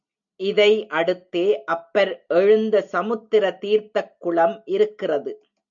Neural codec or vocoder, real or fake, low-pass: none; real; 7.2 kHz